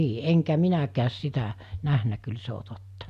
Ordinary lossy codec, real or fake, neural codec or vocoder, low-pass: none; real; none; 14.4 kHz